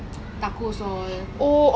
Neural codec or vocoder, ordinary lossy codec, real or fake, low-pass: none; none; real; none